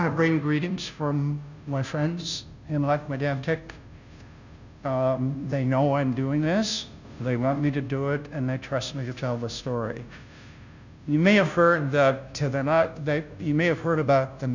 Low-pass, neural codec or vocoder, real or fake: 7.2 kHz; codec, 16 kHz, 0.5 kbps, FunCodec, trained on Chinese and English, 25 frames a second; fake